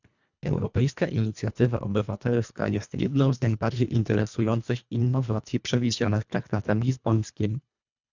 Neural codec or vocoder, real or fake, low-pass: codec, 24 kHz, 1.5 kbps, HILCodec; fake; 7.2 kHz